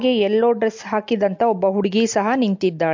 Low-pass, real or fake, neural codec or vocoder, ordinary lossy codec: 7.2 kHz; real; none; MP3, 48 kbps